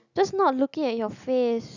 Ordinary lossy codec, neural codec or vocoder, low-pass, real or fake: none; none; 7.2 kHz; real